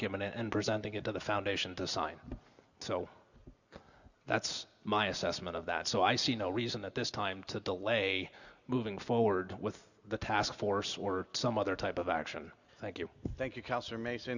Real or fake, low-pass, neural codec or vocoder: real; 7.2 kHz; none